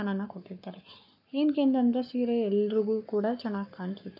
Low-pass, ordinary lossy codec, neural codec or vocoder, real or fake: 5.4 kHz; none; codec, 44.1 kHz, 7.8 kbps, Pupu-Codec; fake